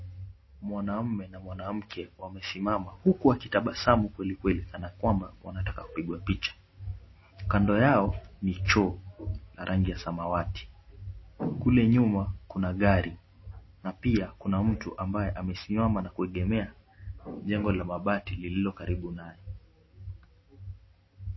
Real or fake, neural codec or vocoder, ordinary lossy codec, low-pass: real; none; MP3, 24 kbps; 7.2 kHz